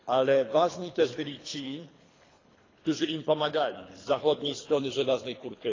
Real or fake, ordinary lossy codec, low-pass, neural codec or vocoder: fake; AAC, 32 kbps; 7.2 kHz; codec, 24 kHz, 3 kbps, HILCodec